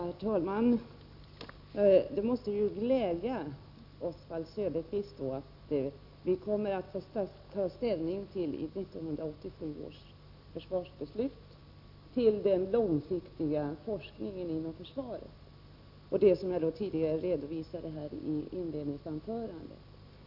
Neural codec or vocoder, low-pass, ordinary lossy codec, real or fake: none; 5.4 kHz; none; real